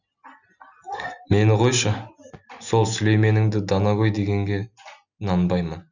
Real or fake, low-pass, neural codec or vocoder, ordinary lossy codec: real; 7.2 kHz; none; none